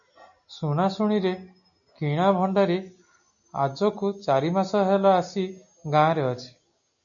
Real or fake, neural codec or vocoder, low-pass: real; none; 7.2 kHz